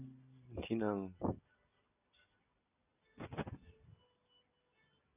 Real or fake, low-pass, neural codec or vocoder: real; 3.6 kHz; none